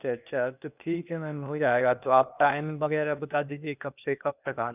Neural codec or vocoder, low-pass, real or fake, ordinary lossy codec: codec, 16 kHz, 0.8 kbps, ZipCodec; 3.6 kHz; fake; none